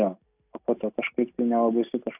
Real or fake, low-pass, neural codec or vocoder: real; 3.6 kHz; none